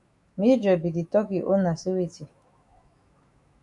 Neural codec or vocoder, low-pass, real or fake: autoencoder, 48 kHz, 128 numbers a frame, DAC-VAE, trained on Japanese speech; 10.8 kHz; fake